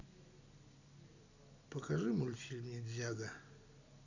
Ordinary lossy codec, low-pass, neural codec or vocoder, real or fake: none; 7.2 kHz; none; real